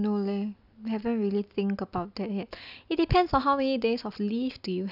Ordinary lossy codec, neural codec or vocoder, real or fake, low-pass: none; none; real; 5.4 kHz